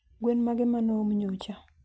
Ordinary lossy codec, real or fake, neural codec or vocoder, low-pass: none; real; none; none